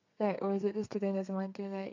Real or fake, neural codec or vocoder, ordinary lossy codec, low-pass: fake; codec, 32 kHz, 1.9 kbps, SNAC; AAC, 48 kbps; 7.2 kHz